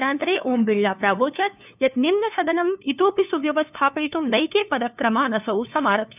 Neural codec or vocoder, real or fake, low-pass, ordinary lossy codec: codec, 16 kHz, 2 kbps, FunCodec, trained on LibriTTS, 25 frames a second; fake; 3.6 kHz; none